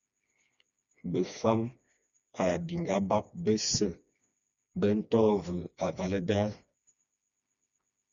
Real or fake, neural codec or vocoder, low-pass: fake; codec, 16 kHz, 2 kbps, FreqCodec, smaller model; 7.2 kHz